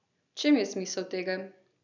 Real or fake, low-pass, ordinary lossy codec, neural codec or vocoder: real; 7.2 kHz; none; none